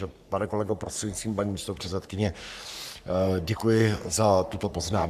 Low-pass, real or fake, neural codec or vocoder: 14.4 kHz; fake; codec, 44.1 kHz, 3.4 kbps, Pupu-Codec